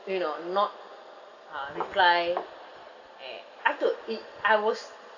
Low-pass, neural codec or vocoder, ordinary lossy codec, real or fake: 7.2 kHz; none; none; real